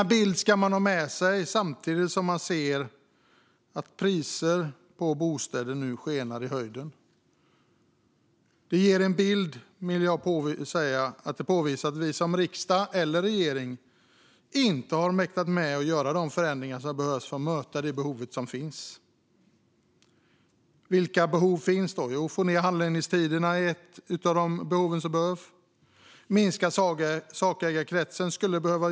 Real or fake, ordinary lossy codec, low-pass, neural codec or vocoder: real; none; none; none